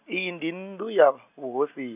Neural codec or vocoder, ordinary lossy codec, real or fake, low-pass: none; none; real; 3.6 kHz